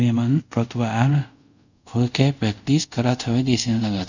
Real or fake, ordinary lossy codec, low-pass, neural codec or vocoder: fake; none; 7.2 kHz; codec, 24 kHz, 0.5 kbps, DualCodec